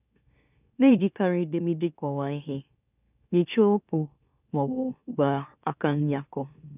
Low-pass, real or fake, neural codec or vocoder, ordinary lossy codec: 3.6 kHz; fake; autoencoder, 44.1 kHz, a latent of 192 numbers a frame, MeloTTS; none